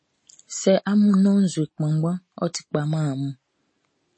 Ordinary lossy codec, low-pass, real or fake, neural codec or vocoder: MP3, 32 kbps; 9.9 kHz; real; none